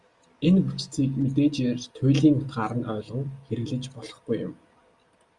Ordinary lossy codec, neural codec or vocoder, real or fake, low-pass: Opus, 64 kbps; none; real; 10.8 kHz